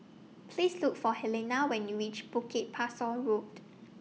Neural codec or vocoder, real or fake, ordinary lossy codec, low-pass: none; real; none; none